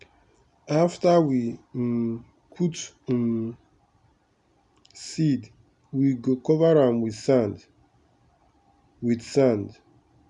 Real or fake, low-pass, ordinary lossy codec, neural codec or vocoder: real; 10.8 kHz; none; none